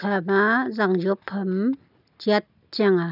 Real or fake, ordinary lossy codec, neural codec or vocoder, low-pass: real; none; none; 5.4 kHz